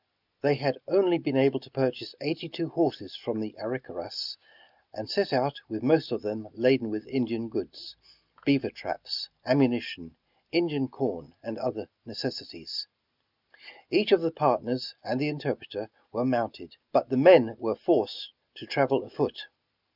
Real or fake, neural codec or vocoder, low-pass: real; none; 5.4 kHz